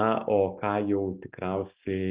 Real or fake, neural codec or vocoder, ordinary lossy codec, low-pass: real; none; Opus, 32 kbps; 3.6 kHz